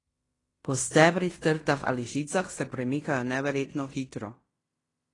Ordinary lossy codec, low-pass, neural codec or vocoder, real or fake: AAC, 32 kbps; 10.8 kHz; codec, 16 kHz in and 24 kHz out, 0.9 kbps, LongCat-Audio-Codec, fine tuned four codebook decoder; fake